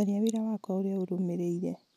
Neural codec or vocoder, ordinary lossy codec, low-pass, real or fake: none; none; 14.4 kHz; real